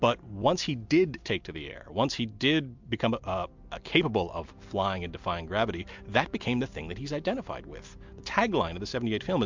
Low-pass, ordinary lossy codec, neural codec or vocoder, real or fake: 7.2 kHz; MP3, 64 kbps; none; real